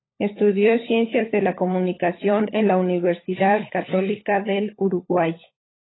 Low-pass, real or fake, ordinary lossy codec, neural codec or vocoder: 7.2 kHz; fake; AAC, 16 kbps; codec, 16 kHz, 16 kbps, FunCodec, trained on LibriTTS, 50 frames a second